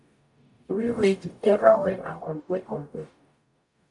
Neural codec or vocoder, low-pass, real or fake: codec, 44.1 kHz, 0.9 kbps, DAC; 10.8 kHz; fake